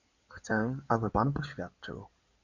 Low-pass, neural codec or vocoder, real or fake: 7.2 kHz; codec, 16 kHz in and 24 kHz out, 2.2 kbps, FireRedTTS-2 codec; fake